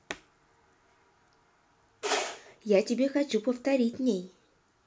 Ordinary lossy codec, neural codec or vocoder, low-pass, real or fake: none; none; none; real